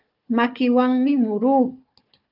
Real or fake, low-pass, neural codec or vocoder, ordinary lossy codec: fake; 5.4 kHz; codec, 16 kHz, 4 kbps, FunCodec, trained on Chinese and English, 50 frames a second; Opus, 24 kbps